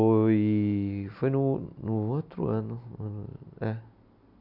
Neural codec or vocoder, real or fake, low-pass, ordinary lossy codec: none; real; 5.4 kHz; none